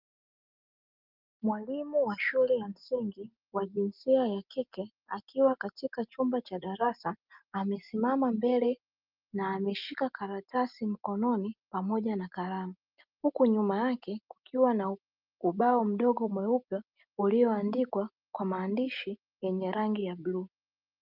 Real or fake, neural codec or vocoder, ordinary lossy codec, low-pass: real; none; Opus, 32 kbps; 5.4 kHz